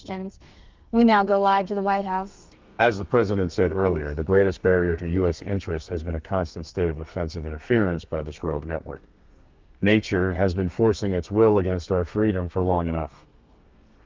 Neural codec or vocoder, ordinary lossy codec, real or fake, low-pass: codec, 32 kHz, 1.9 kbps, SNAC; Opus, 24 kbps; fake; 7.2 kHz